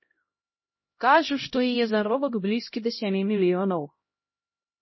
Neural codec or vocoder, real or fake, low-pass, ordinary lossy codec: codec, 16 kHz, 0.5 kbps, X-Codec, HuBERT features, trained on LibriSpeech; fake; 7.2 kHz; MP3, 24 kbps